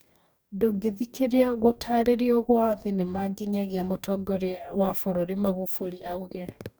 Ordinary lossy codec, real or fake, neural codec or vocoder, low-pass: none; fake; codec, 44.1 kHz, 2.6 kbps, DAC; none